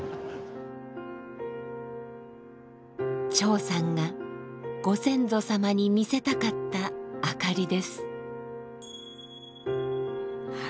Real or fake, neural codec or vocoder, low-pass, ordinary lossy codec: real; none; none; none